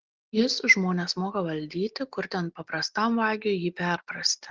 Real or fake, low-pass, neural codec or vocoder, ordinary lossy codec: real; 7.2 kHz; none; Opus, 16 kbps